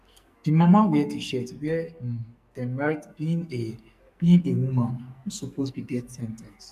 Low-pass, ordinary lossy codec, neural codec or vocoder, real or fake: 14.4 kHz; none; codec, 44.1 kHz, 2.6 kbps, SNAC; fake